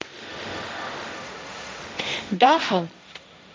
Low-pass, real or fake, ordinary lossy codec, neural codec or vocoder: none; fake; none; codec, 16 kHz, 1.1 kbps, Voila-Tokenizer